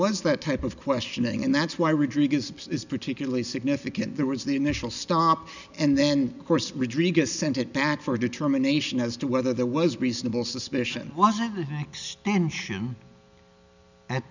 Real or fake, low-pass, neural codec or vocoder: real; 7.2 kHz; none